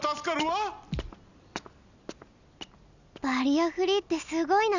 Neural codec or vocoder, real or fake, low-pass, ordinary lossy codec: none; real; 7.2 kHz; none